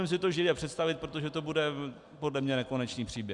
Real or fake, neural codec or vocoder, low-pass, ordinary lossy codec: real; none; 10.8 kHz; Opus, 64 kbps